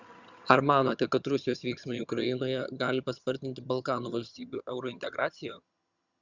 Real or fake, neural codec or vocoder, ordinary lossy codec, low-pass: fake; vocoder, 22.05 kHz, 80 mel bands, HiFi-GAN; Opus, 64 kbps; 7.2 kHz